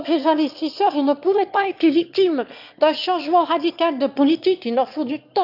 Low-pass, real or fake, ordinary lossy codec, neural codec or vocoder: 5.4 kHz; fake; none; autoencoder, 22.05 kHz, a latent of 192 numbers a frame, VITS, trained on one speaker